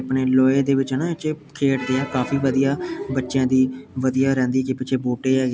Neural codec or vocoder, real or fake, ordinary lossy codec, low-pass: none; real; none; none